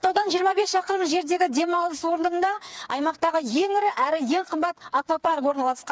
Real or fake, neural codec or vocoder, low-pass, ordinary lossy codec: fake; codec, 16 kHz, 4 kbps, FreqCodec, smaller model; none; none